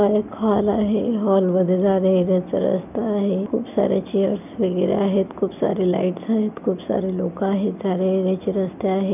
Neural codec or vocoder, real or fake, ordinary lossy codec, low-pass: none; real; none; 3.6 kHz